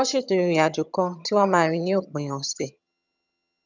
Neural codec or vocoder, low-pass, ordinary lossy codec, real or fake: vocoder, 22.05 kHz, 80 mel bands, HiFi-GAN; 7.2 kHz; none; fake